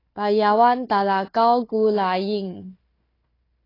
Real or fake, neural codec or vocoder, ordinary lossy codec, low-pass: fake; codec, 16 kHz, 4 kbps, FunCodec, trained on Chinese and English, 50 frames a second; AAC, 24 kbps; 5.4 kHz